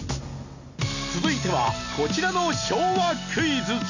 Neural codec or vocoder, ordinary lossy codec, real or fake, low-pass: none; none; real; 7.2 kHz